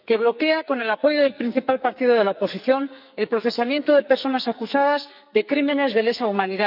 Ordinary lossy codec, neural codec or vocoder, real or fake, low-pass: none; codec, 44.1 kHz, 2.6 kbps, SNAC; fake; 5.4 kHz